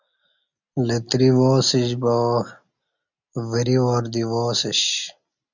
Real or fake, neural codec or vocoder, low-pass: real; none; 7.2 kHz